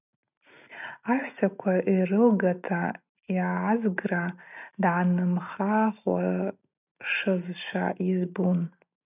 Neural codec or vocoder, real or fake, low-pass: none; real; 3.6 kHz